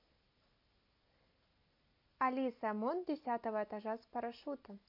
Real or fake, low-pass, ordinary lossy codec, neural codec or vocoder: real; 5.4 kHz; MP3, 32 kbps; none